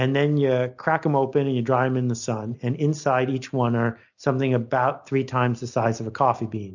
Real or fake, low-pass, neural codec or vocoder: real; 7.2 kHz; none